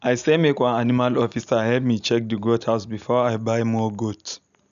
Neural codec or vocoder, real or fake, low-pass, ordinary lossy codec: none; real; 7.2 kHz; none